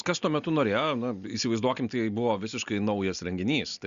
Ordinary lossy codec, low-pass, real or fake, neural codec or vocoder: Opus, 64 kbps; 7.2 kHz; real; none